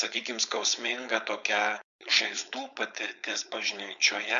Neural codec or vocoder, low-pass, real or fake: codec, 16 kHz, 4.8 kbps, FACodec; 7.2 kHz; fake